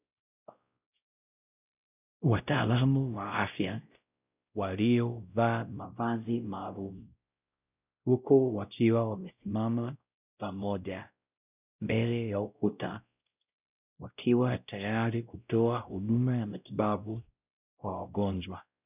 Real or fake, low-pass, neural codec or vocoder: fake; 3.6 kHz; codec, 16 kHz, 0.5 kbps, X-Codec, WavLM features, trained on Multilingual LibriSpeech